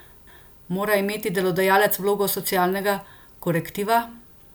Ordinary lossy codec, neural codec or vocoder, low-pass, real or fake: none; none; none; real